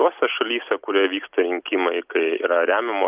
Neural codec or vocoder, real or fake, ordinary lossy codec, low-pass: none; real; Opus, 32 kbps; 3.6 kHz